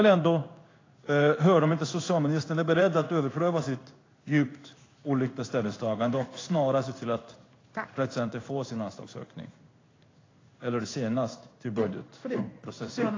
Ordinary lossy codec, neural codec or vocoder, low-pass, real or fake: AAC, 32 kbps; codec, 16 kHz in and 24 kHz out, 1 kbps, XY-Tokenizer; 7.2 kHz; fake